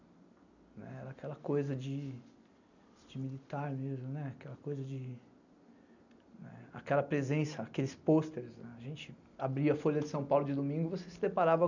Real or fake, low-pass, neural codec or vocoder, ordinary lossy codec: real; 7.2 kHz; none; none